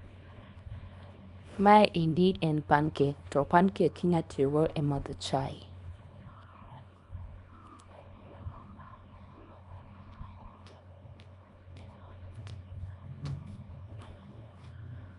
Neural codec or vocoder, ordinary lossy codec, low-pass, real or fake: codec, 24 kHz, 0.9 kbps, WavTokenizer, small release; none; 10.8 kHz; fake